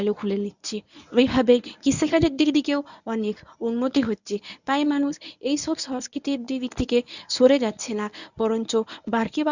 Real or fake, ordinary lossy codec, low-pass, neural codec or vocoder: fake; none; 7.2 kHz; codec, 24 kHz, 0.9 kbps, WavTokenizer, medium speech release version 1